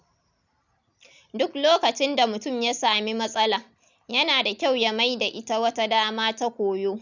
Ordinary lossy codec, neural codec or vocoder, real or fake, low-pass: none; none; real; 7.2 kHz